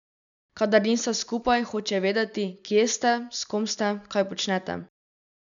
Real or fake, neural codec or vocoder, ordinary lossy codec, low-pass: real; none; none; 7.2 kHz